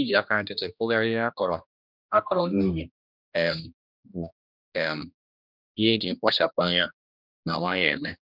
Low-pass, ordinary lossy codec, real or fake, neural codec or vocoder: 5.4 kHz; none; fake; codec, 16 kHz, 1 kbps, X-Codec, HuBERT features, trained on general audio